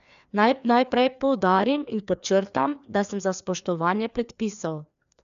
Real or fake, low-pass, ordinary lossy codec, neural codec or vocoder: fake; 7.2 kHz; none; codec, 16 kHz, 2 kbps, FreqCodec, larger model